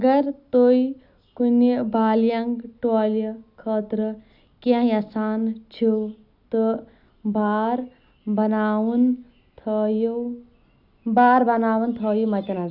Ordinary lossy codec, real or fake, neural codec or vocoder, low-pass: none; real; none; 5.4 kHz